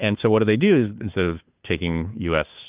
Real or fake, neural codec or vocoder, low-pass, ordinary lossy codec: fake; codec, 16 kHz, 2 kbps, FunCodec, trained on Chinese and English, 25 frames a second; 3.6 kHz; Opus, 24 kbps